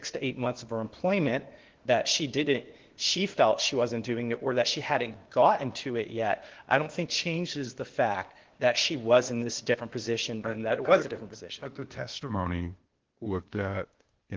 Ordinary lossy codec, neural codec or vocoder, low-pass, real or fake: Opus, 16 kbps; codec, 16 kHz, 0.8 kbps, ZipCodec; 7.2 kHz; fake